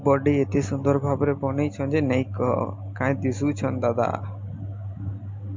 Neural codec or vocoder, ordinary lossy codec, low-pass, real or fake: none; MP3, 48 kbps; 7.2 kHz; real